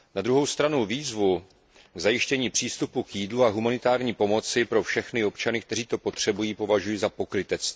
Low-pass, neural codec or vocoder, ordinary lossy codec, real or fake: none; none; none; real